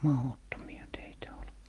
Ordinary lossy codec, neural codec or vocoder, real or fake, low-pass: Opus, 24 kbps; none; real; 10.8 kHz